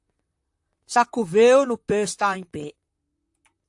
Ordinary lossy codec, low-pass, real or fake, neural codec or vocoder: AAC, 64 kbps; 10.8 kHz; fake; vocoder, 44.1 kHz, 128 mel bands, Pupu-Vocoder